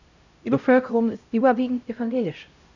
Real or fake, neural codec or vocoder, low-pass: fake; codec, 16 kHz, 0.5 kbps, X-Codec, HuBERT features, trained on LibriSpeech; 7.2 kHz